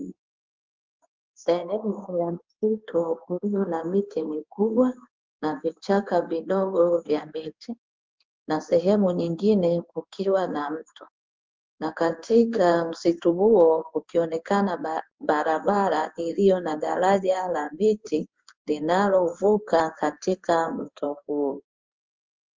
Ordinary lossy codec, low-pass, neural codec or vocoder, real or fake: Opus, 32 kbps; 7.2 kHz; codec, 16 kHz in and 24 kHz out, 1 kbps, XY-Tokenizer; fake